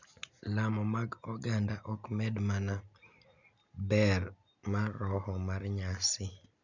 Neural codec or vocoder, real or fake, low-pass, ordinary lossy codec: none; real; 7.2 kHz; none